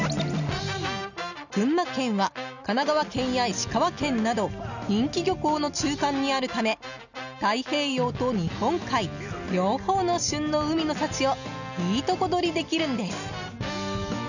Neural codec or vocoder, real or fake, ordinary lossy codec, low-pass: none; real; none; 7.2 kHz